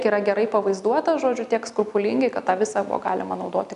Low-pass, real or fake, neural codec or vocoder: 10.8 kHz; real; none